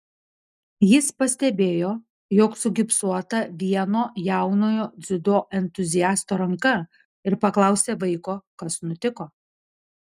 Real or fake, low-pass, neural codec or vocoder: real; 14.4 kHz; none